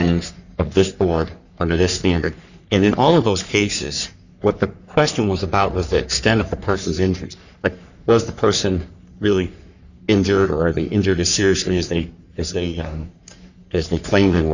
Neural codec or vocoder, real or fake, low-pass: codec, 44.1 kHz, 3.4 kbps, Pupu-Codec; fake; 7.2 kHz